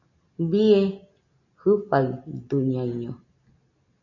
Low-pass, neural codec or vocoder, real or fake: 7.2 kHz; none; real